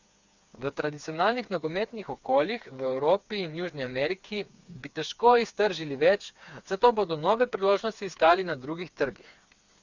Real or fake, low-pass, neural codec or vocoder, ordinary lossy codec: fake; 7.2 kHz; codec, 16 kHz, 4 kbps, FreqCodec, smaller model; Opus, 64 kbps